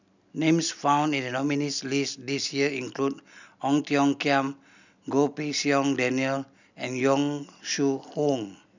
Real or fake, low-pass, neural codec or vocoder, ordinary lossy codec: real; 7.2 kHz; none; none